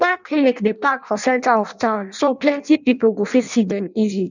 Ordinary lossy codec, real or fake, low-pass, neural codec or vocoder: none; fake; 7.2 kHz; codec, 16 kHz in and 24 kHz out, 0.6 kbps, FireRedTTS-2 codec